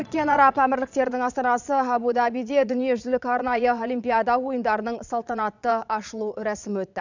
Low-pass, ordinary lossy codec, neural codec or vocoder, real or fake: 7.2 kHz; none; vocoder, 22.05 kHz, 80 mel bands, Vocos; fake